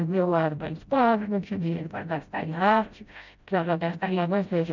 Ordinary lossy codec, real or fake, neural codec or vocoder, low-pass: none; fake; codec, 16 kHz, 0.5 kbps, FreqCodec, smaller model; 7.2 kHz